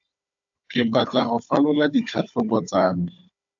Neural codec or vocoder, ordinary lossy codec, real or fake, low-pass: codec, 16 kHz, 4 kbps, FunCodec, trained on Chinese and English, 50 frames a second; AAC, 64 kbps; fake; 7.2 kHz